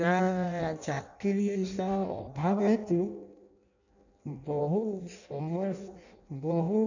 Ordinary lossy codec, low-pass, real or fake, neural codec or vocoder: none; 7.2 kHz; fake; codec, 16 kHz in and 24 kHz out, 0.6 kbps, FireRedTTS-2 codec